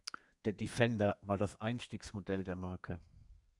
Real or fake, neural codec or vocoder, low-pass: fake; codec, 32 kHz, 1.9 kbps, SNAC; 10.8 kHz